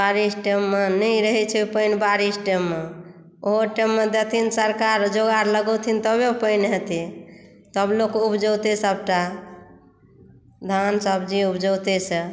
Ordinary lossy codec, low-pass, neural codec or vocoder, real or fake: none; none; none; real